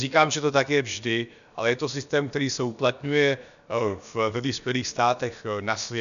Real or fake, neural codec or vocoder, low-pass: fake; codec, 16 kHz, about 1 kbps, DyCAST, with the encoder's durations; 7.2 kHz